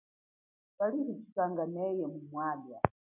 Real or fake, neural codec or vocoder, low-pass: real; none; 3.6 kHz